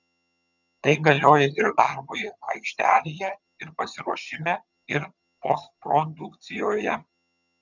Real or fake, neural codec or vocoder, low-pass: fake; vocoder, 22.05 kHz, 80 mel bands, HiFi-GAN; 7.2 kHz